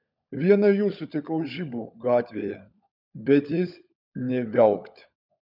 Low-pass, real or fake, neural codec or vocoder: 5.4 kHz; fake; codec, 16 kHz, 16 kbps, FunCodec, trained on LibriTTS, 50 frames a second